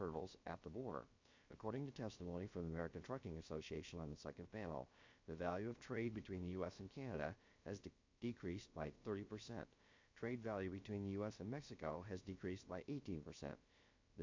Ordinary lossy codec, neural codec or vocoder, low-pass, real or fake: MP3, 48 kbps; codec, 16 kHz, about 1 kbps, DyCAST, with the encoder's durations; 7.2 kHz; fake